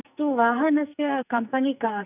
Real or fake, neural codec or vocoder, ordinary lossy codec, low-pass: fake; codec, 44.1 kHz, 2.6 kbps, SNAC; none; 3.6 kHz